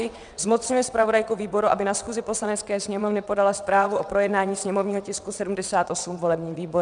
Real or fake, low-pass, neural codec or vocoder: fake; 9.9 kHz; vocoder, 22.05 kHz, 80 mel bands, WaveNeXt